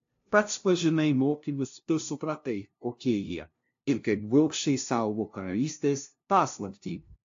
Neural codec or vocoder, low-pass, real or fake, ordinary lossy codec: codec, 16 kHz, 0.5 kbps, FunCodec, trained on LibriTTS, 25 frames a second; 7.2 kHz; fake; AAC, 48 kbps